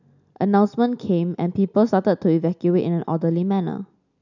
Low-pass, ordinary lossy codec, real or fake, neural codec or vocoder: 7.2 kHz; none; real; none